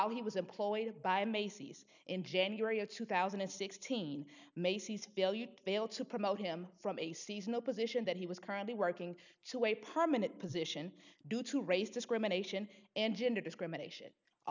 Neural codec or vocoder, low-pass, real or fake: none; 7.2 kHz; real